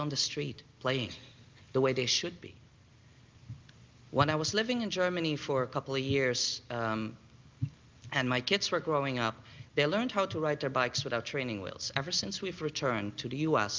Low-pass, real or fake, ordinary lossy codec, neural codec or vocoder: 7.2 kHz; real; Opus, 32 kbps; none